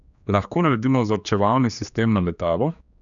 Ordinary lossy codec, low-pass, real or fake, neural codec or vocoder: none; 7.2 kHz; fake; codec, 16 kHz, 2 kbps, X-Codec, HuBERT features, trained on general audio